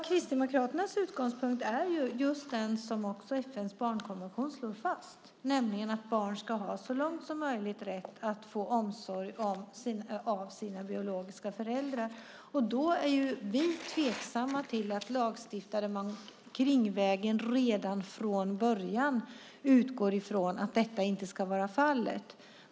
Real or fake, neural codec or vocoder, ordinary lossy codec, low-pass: real; none; none; none